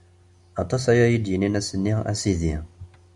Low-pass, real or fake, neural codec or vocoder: 10.8 kHz; real; none